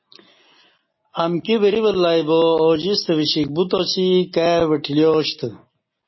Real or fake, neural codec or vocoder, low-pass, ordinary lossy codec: real; none; 7.2 kHz; MP3, 24 kbps